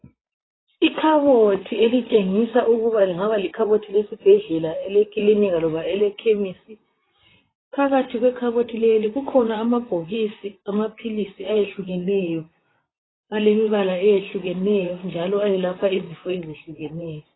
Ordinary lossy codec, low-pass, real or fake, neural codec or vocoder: AAC, 16 kbps; 7.2 kHz; fake; vocoder, 44.1 kHz, 128 mel bands, Pupu-Vocoder